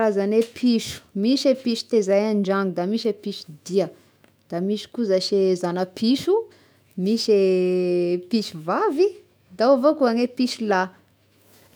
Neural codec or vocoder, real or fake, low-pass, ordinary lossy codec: autoencoder, 48 kHz, 128 numbers a frame, DAC-VAE, trained on Japanese speech; fake; none; none